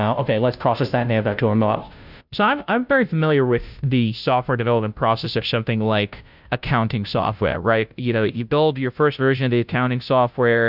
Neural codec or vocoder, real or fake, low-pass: codec, 16 kHz, 0.5 kbps, FunCodec, trained on Chinese and English, 25 frames a second; fake; 5.4 kHz